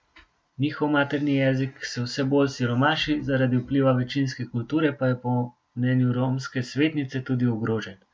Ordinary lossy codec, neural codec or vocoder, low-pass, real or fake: none; none; none; real